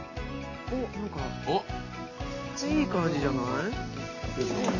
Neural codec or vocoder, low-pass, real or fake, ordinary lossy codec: none; 7.2 kHz; real; Opus, 64 kbps